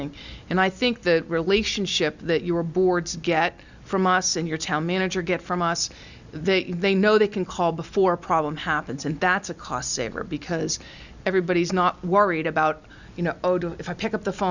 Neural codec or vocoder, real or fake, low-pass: none; real; 7.2 kHz